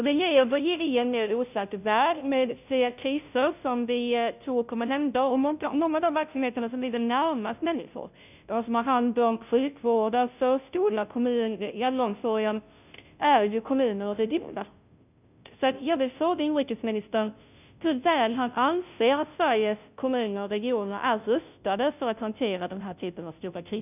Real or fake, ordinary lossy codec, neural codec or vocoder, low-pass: fake; none; codec, 16 kHz, 0.5 kbps, FunCodec, trained on Chinese and English, 25 frames a second; 3.6 kHz